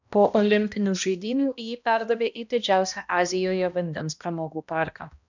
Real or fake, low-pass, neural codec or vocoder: fake; 7.2 kHz; codec, 16 kHz, 1 kbps, X-Codec, HuBERT features, trained on balanced general audio